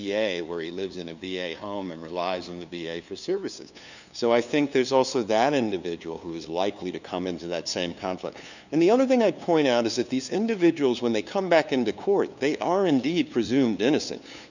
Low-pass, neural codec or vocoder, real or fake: 7.2 kHz; codec, 16 kHz, 2 kbps, FunCodec, trained on LibriTTS, 25 frames a second; fake